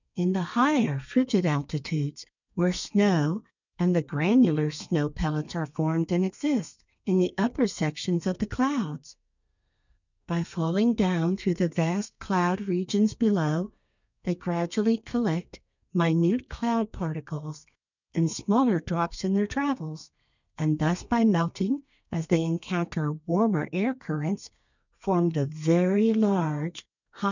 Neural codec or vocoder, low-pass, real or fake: codec, 32 kHz, 1.9 kbps, SNAC; 7.2 kHz; fake